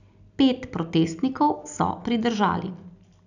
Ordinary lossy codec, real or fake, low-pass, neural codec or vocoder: none; real; 7.2 kHz; none